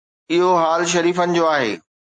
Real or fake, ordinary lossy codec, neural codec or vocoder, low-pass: real; AAC, 48 kbps; none; 9.9 kHz